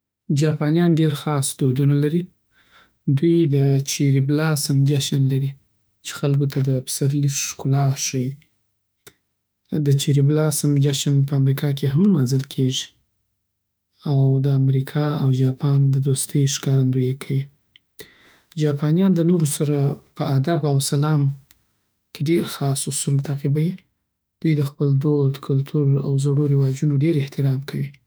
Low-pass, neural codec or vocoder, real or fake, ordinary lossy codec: none; autoencoder, 48 kHz, 32 numbers a frame, DAC-VAE, trained on Japanese speech; fake; none